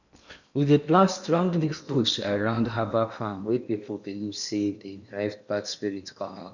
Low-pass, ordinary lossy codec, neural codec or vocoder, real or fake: 7.2 kHz; none; codec, 16 kHz in and 24 kHz out, 0.8 kbps, FocalCodec, streaming, 65536 codes; fake